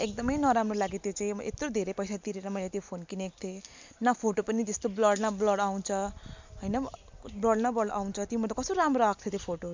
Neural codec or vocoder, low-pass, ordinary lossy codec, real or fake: none; 7.2 kHz; MP3, 64 kbps; real